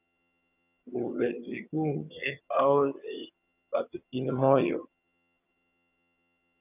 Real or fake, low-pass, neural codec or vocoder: fake; 3.6 kHz; vocoder, 22.05 kHz, 80 mel bands, HiFi-GAN